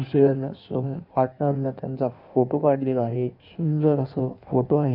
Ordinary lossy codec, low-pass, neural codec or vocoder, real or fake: none; 5.4 kHz; codec, 16 kHz in and 24 kHz out, 1.1 kbps, FireRedTTS-2 codec; fake